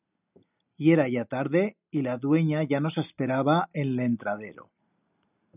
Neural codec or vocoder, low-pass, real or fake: none; 3.6 kHz; real